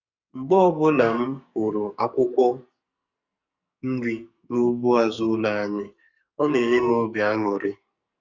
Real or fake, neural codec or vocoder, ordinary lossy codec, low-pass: fake; codec, 44.1 kHz, 2.6 kbps, SNAC; Opus, 64 kbps; 7.2 kHz